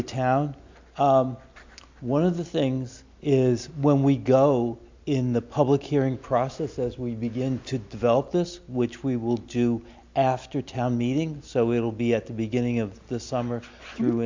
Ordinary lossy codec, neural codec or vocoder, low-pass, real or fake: MP3, 64 kbps; none; 7.2 kHz; real